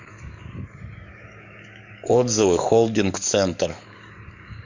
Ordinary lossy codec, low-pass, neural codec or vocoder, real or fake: Opus, 64 kbps; 7.2 kHz; codec, 44.1 kHz, 7.8 kbps, DAC; fake